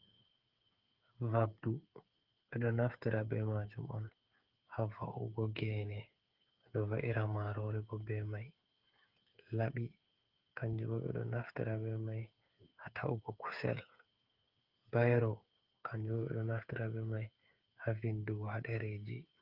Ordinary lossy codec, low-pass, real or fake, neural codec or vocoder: Opus, 32 kbps; 5.4 kHz; fake; codec, 16 kHz, 8 kbps, FreqCodec, smaller model